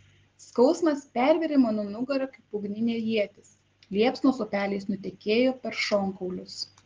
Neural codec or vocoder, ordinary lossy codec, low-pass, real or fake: none; Opus, 16 kbps; 7.2 kHz; real